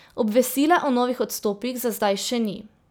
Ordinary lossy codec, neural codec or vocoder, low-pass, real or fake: none; none; none; real